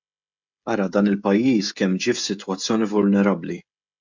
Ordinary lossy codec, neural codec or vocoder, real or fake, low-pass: MP3, 64 kbps; codec, 16 kHz, 16 kbps, FreqCodec, smaller model; fake; 7.2 kHz